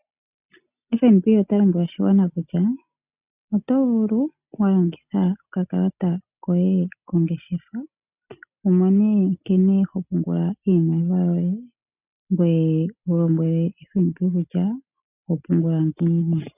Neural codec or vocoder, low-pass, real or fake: none; 3.6 kHz; real